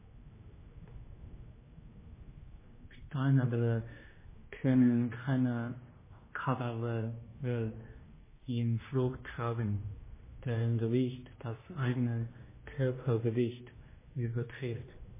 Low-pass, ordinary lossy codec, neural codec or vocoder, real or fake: 3.6 kHz; MP3, 16 kbps; codec, 16 kHz, 1 kbps, X-Codec, HuBERT features, trained on general audio; fake